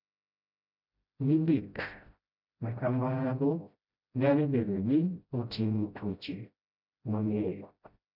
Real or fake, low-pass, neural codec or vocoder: fake; 5.4 kHz; codec, 16 kHz, 0.5 kbps, FreqCodec, smaller model